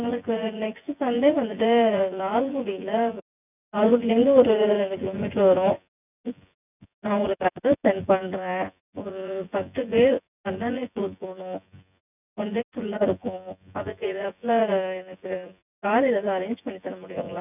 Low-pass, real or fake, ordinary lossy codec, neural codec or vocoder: 3.6 kHz; fake; none; vocoder, 24 kHz, 100 mel bands, Vocos